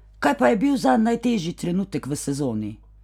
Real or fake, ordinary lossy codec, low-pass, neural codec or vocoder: real; none; 19.8 kHz; none